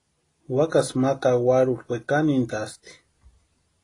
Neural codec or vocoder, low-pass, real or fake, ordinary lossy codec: none; 10.8 kHz; real; AAC, 32 kbps